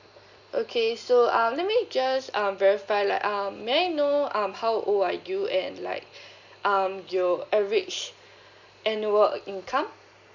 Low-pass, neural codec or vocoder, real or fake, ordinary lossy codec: 7.2 kHz; none; real; none